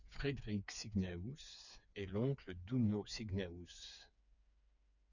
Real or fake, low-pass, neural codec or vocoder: fake; 7.2 kHz; codec, 16 kHz, 4 kbps, FreqCodec, smaller model